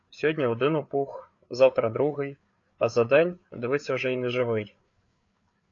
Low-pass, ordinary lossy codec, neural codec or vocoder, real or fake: 7.2 kHz; AAC, 48 kbps; codec, 16 kHz, 4 kbps, FreqCodec, larger model; fake